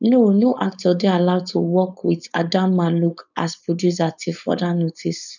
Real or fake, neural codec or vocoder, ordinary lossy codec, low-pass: fake; codec, 16 kHz, 4.8 kbps, FACodec; none; 7.2 kHz